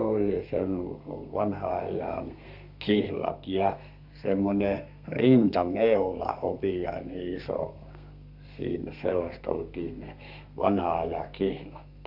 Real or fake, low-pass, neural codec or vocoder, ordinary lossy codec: fake; 5.4 kHz; codec, 32 kHz, 1.9 kbps, SNAC; none